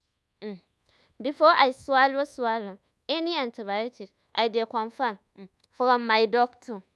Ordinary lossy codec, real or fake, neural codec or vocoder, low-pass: none; fake; codec, 24 kHz, 1.2 kbps, DualCodec; none